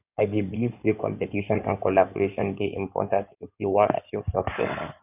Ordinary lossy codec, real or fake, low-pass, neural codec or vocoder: none; fake; 3.6 kHz; codec, 16 kHz in and 24 kHz out, 2.2 kbps, FireRedTTS-2 codec